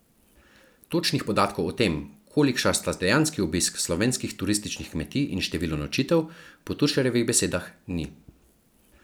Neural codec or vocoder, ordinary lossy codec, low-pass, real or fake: none; none; none; real